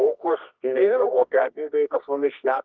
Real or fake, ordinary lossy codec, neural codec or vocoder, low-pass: fake; Opus, 32 kbps; codec, 24 kHz, 0.9 kbps, WavTokenizer, medium music audio release; 7.2 kHz